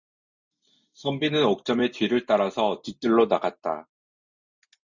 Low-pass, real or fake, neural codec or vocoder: 7.2 kHz; real; none